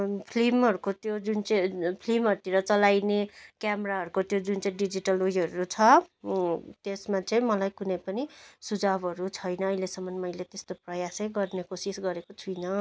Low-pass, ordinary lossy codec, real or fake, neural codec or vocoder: none; none; real; none